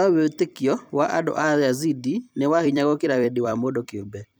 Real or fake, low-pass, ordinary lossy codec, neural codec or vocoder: fake; none; none; vocoder, 44.1 kHz, 128 mel bands every 256 samples, BigVGAN v2